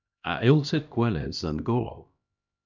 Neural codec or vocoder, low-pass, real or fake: codec, 16 kHz, 1 kbps, X-Codec, HuBERT features, trained on LibriSpeech; 7.2 kHz; fake